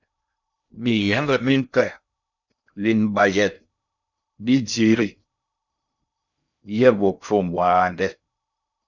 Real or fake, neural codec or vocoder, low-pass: fake; codec, 16 kHz in and 24 kHz out, 0.6 kbps, FocalCodec, streaming, 2048 codes; 7.2 kHz